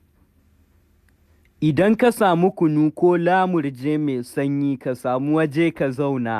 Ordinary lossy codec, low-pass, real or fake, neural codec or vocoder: none; 14.4 kHz; real; none